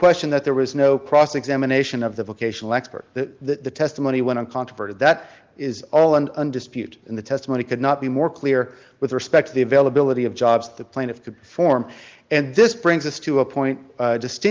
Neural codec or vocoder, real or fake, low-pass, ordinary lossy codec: none; real; 7.2 kHz; Opus, 32 kbps